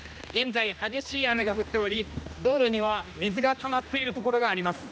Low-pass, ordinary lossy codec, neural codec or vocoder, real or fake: none; none; codec, 16 kHz, 1 kbps, X-Codec, HuBERT features, trained on general audio; fake